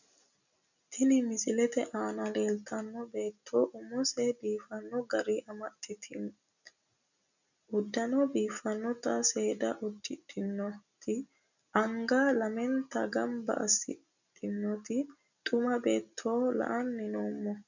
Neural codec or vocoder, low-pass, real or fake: none; 7.2 kHz; real